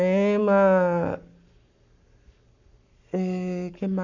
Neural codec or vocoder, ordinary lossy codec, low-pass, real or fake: codec, 44.1 kHz, 7.8 kbps, Pupu-Codec; none; 7.2 kHz; fake